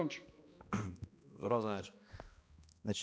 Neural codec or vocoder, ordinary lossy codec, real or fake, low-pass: codec, 16 kHz, 1 kbps, X-Codec, HuBERT features, trained on balanced general audio; none; fake; none